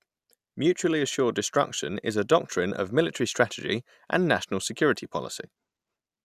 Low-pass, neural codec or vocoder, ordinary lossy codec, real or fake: 14.4 kHz; none; none; real